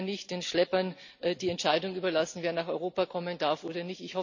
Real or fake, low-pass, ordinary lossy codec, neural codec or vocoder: real; 7.2 kHz; none; none